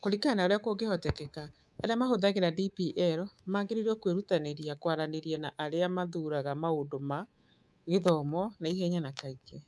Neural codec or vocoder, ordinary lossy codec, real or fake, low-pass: codec, 24 kHz, 3.1 kbps, DualCodec; none; fake; none